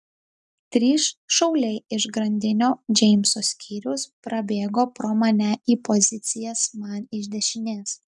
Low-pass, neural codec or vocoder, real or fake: 10.8 kHz; none; real